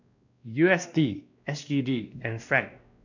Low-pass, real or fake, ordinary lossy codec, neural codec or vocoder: 7.2 kHz; fake; none; codec, 16 kHz, 1 kbps, X-Codec, WavLM features, trained on Multilingual LibriSpeech